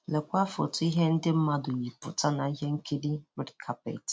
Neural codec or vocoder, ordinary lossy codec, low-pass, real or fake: none; none; none; real